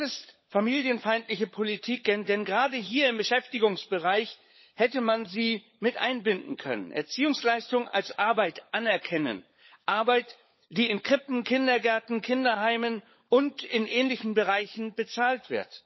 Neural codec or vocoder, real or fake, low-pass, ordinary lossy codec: codec, 16 kHz, 16 kbps, FunCodec, trained on LibriTTS, 50 frames a second; fake; 7.2 kHz; MP3, 24 kbps